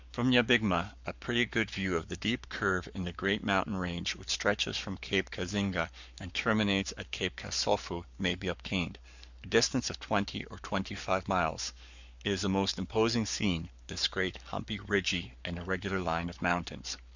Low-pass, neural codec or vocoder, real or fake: 7.2 kHz; codec, 44.1 kHz, 7.8 kbps, Pupu-Codec; fake